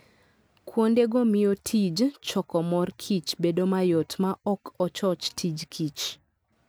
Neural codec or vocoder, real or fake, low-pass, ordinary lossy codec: none; real; none; none